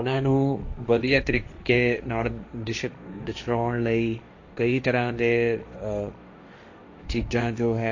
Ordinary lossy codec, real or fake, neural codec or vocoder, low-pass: AAC, 48 kbps; fake; codec, 16 kHz, 1.1 kbps, Voila-Tokenizer; 7.2 kHz